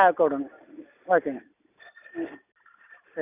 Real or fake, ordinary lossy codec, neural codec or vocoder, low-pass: real; none; none; 3.6 kHz